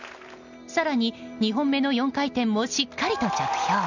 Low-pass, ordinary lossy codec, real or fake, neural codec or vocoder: 7.2 kHz; none; real; none